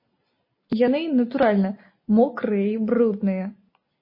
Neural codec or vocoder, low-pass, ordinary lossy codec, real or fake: none; 5.4 kHz; MP3, 24 kbps; real